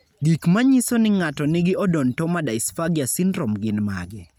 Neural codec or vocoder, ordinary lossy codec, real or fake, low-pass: none; none; real; none